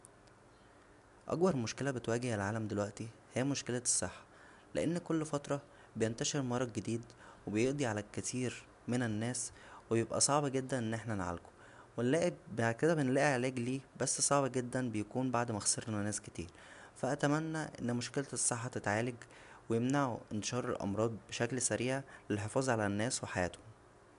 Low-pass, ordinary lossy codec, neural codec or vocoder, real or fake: 10.8 kHz; none; none; real